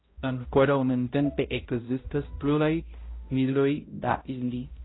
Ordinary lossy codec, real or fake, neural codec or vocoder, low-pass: AAC, 16 kbps; fake; codec, 16 kHz, 0.5 kbps, X-Codec, HuBERT features, trained on balanced general audio; 7.2 kHz